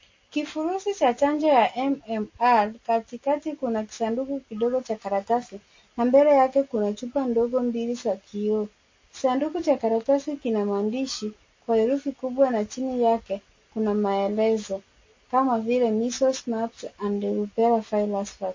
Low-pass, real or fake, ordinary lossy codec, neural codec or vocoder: 7.2 kHz; real; MP3, 32 kbps; none